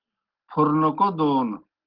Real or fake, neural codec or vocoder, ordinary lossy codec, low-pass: real; none; Opus, 16 kbps; 5.4 kHz